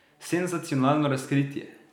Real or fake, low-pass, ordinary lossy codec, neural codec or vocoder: real; 19.8 kHz; none; none